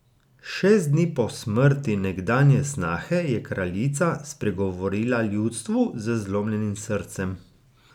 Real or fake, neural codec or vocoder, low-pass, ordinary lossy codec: real; none; 19.8 kHz; none